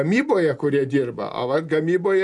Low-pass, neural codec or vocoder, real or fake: 10.8 kHz; none; real